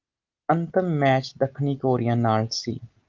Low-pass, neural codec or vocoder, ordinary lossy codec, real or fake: 7.2 kHz; none; Opus, 32 kbps; real